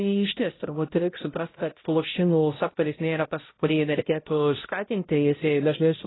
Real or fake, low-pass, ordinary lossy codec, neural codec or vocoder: fake; 7.2 kHz; AAC, 16 kbps; codec, 16 kHz, 0.5 kbps, X-Codec, HuBERT features, trained on balanced general audio